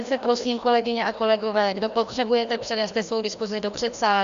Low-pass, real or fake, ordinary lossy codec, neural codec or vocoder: 7.2 kHz; fake; Opus, 64 kbps; codec, 16 kHz, 1 kbps, FreqCodec, larger model